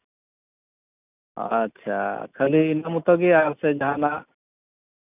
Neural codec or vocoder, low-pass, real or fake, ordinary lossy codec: none; 3.6 kHz; real; none